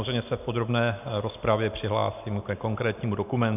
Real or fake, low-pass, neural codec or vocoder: real; 3.6 kHz; none